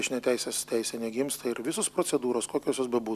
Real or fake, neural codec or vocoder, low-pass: real; none; 14.4 kHz